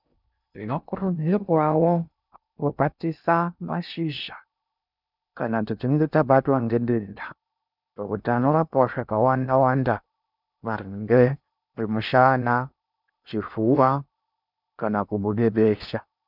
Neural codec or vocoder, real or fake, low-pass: codec, 16 kHz in and 24 kHz out, 0.6 kbps, FocalCodec, streaming, 2048 codes; fake; 5.4 kHz